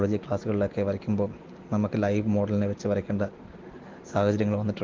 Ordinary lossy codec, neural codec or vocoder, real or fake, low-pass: Opus, 16 kbps; none; real; 7.2 kHz